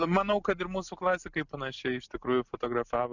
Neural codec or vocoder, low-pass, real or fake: none; 7.2 kHz; real